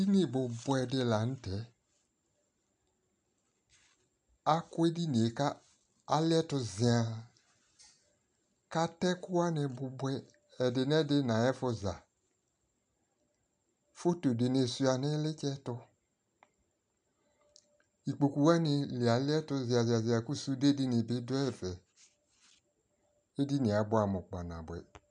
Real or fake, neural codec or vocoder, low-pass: real; none; 9.9 kHz